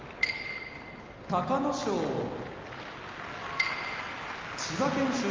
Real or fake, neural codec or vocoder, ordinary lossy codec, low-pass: real; none; Opus, 24 kbps; 7.2 kHz